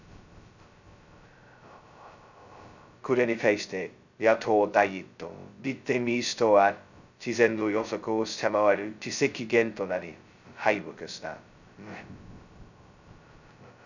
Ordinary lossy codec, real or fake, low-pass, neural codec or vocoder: none; fake; 7.2 kHz; codec, 16 kHz, 0.2 kbps, FocalCodec